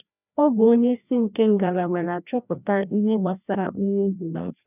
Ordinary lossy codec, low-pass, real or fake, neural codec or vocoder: none; 3.6 kHz; fake; codec, 16 kHz, 1 kbps, FreqCodec, larger model